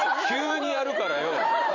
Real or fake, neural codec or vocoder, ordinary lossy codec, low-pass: fake; vocoder, 44.1 kHz, 128 mel bands every 512 samples, BigVGAN v2; none; 7.2 kHz